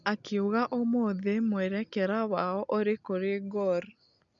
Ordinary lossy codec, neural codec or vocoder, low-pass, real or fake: none; none; 7.2 kHz; real